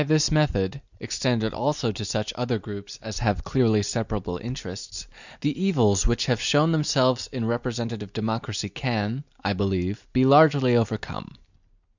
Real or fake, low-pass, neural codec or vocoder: real; 7.2 kHz; none